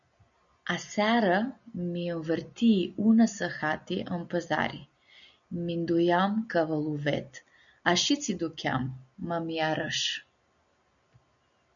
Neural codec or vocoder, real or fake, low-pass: none; real; 7.2 kHz